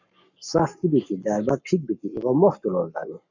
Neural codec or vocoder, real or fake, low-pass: codec, 44.1 kHz, 7.8 kbps, Pupu-Codec; fake; 7.2 kHz